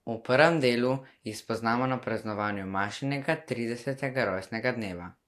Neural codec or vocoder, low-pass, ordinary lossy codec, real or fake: none; 14.4 kHz; AAC, 64 kbps; real